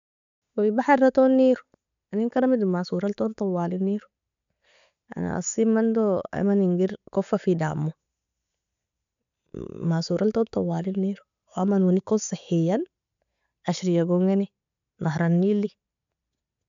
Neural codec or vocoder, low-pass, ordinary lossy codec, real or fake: none; 7.2 kHz; none; real